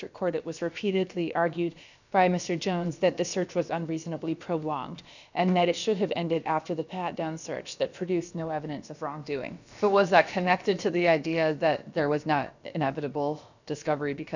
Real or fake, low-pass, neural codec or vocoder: fake; 7.2 kHz; codec, 16 kHz, about 1 kbps, DyCAST, with the encoder's durations